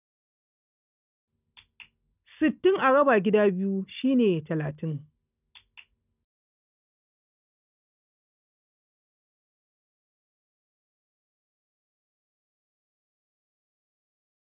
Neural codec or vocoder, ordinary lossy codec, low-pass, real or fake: none; none; 3.6 kHz; real